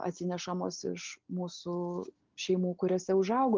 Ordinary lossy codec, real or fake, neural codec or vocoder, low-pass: Opus, 32 kbps; real; none; 7.2 kHz